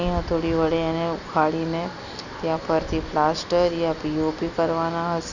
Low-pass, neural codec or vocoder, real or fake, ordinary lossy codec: 7.2 kHz; none; real; none